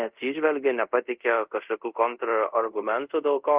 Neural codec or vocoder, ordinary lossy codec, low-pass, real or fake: codec, 24 kHz, 0.5 kbps, DualCodec; Opus, 32 kbps; 3.6 kHz; fake